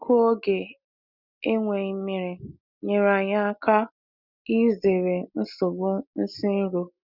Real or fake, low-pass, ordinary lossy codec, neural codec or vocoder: real; 5.4 kHz; none; none